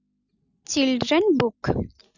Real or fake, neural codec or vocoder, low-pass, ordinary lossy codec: real; none; 7.2 kHz; none